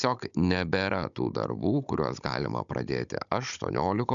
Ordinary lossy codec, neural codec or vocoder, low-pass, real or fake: MP3, 96 kbps; codec, 16 kHz, 16 kbps, FunCodec, trained on Chinese and English, 50 frames a second; 7.2 kHz; fake